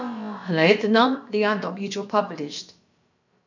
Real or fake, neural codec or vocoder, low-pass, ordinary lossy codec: fake; codec, 16 kHz, about 1 kbps, DyCAST, with the encoder's durations; 7.2 kHz; MP3, 64 kbps